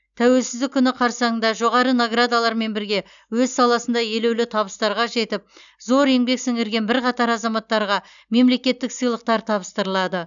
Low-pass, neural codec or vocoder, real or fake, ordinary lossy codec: 7.2 kHz; none; real; none